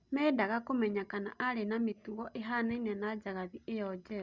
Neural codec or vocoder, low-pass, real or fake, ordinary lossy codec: none; 7.2 kHz; real; Opus, 64 kbps